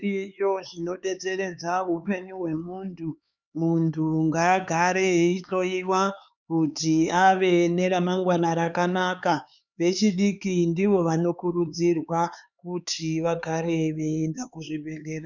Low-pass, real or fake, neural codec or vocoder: 7.2 kHz; fake; codec, 16 kHz, 4 kbps, X-Codec, HuBERT features, trained on LibriSpeech